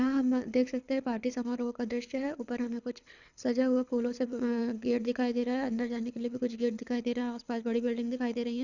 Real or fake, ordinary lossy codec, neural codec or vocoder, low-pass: fake; none; codec, 24 kHz, 6 kbps, HILCodec; 7.2 kHz